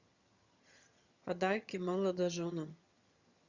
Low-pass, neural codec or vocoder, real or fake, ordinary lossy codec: 7.2 kHz; vocoder, 22.05 kHz, 80 mel bands, HiFi-GAN; fake; Opus, 64 kbps